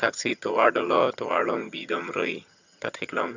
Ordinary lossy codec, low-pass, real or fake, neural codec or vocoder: none; 7.2 kHz; fake; vocoder, 22.05 kHz, 80 mel bands, HiFi-GAN